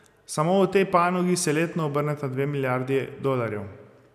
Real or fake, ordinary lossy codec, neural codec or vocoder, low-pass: real; none; none; 14.4 kHz